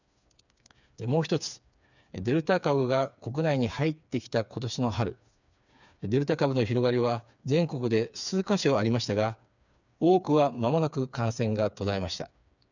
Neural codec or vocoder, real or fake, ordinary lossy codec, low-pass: codec, 16 kHz, 4 kbps, FreqCodec, smaller model; fake; none; 7.2 kHz